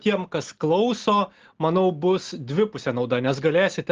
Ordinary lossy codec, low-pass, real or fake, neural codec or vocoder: Opus, 16 kbps; 7.2 kHz; real; none